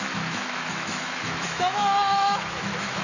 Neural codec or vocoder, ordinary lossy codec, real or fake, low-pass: autoencoder, 48 kHz, 128 numbers a frame, DAC-VAE, trained on Japanese speech; none; fake; 7.2 kHz